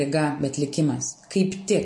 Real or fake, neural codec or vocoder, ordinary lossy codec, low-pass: real; none; MP3, 48 kbps; 10.8 kHz